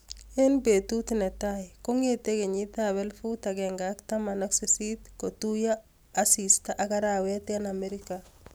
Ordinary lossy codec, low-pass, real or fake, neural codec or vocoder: none; none; real; none